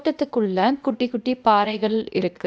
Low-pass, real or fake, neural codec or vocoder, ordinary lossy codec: none; fake; codec, 16 kHz, 0.8 kbps, ZipCodec; none